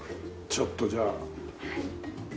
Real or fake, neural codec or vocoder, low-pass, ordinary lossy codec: real; none; none; none